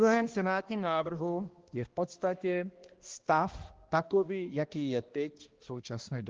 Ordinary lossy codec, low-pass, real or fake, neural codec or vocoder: Opus, 16 kbps; 7.2 kHz; fake; codec, 16 kHz, 1 kbps, X-Codec, HuBERT features, trained on balanced general audio